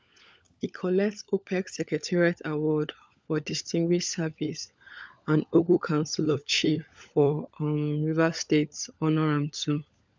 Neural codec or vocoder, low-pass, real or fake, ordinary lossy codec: codec, 16 kHz, 16 kbps, FunCodec, trained on LibriTTS, 50 frames a second; none; fake; none